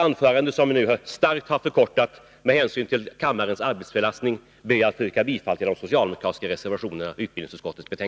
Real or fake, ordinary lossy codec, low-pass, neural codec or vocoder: real; none; none; none